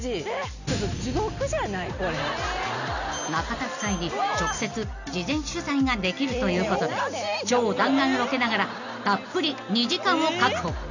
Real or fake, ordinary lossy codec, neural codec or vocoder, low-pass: real; none; none; 7.2 kHz